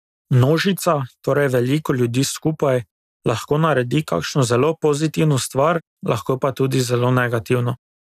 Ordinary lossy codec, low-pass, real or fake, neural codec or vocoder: none; 14.4 kHz; real; none